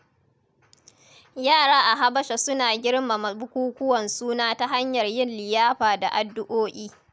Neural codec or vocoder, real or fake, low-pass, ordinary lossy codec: none; real; none; none